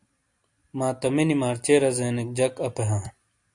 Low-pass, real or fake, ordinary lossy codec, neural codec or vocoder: 10.8 kHz; real; AAC, 64 kbps; none